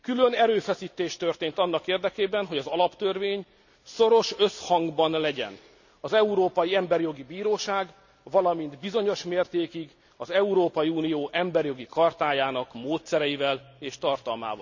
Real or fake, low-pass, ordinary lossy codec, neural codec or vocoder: real; 7.2 kHz; none; none